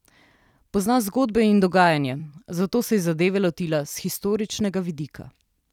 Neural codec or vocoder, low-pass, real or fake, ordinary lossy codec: vocoder, 44.1 kHz, 128 mel bands every 256 samples, BigVGAN v2; 19.8 kHz; fake; none